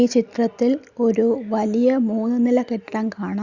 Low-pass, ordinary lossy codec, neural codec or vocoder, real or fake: 7.2 kHz; Opus, 64 kbps; none; real